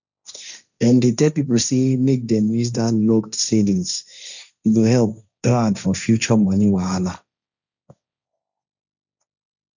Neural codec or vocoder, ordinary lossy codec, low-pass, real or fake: codec, 16 kHz, 1.1 kbps, Voila-Tokenizer; none; 7.2 kHz; fake